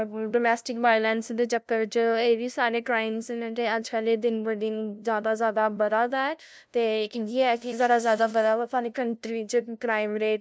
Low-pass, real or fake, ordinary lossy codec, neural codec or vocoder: none; fake; none; codec, 16 kHz, 0.5 kbps, FunCodec, trained on LibriTTS, 25 frames a second